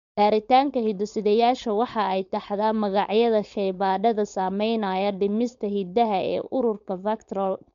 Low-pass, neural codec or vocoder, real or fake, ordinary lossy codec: 7.2 kHz; codec, 16 kHz, 4.8 kbps, FACodec; fake; MP3, 64 kbps